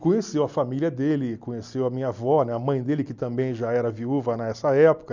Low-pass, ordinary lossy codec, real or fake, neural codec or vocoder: 7.2 kHz; none; real; none